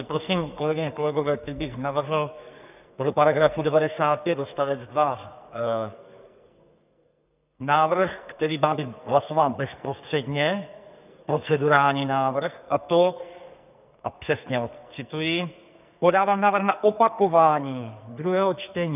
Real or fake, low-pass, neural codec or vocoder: fake; 3.6 kHz; codec, 44.1 kHz, 2.6 kbps, SNAC